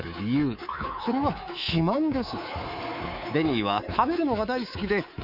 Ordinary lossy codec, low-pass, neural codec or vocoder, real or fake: none; 5.4 kHz; codec, 24 kHz, 3.1 kbps, DualCodec; fake